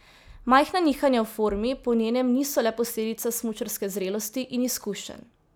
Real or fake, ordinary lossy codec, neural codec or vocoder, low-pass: real; none; none; none